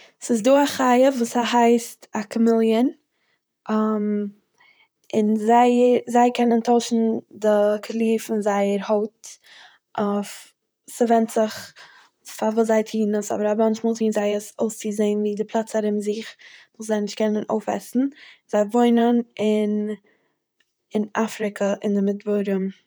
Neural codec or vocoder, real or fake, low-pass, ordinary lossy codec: vocoder, 44.1 kHz, 128 mel bands, Pupu-Vocoder; fake; none; none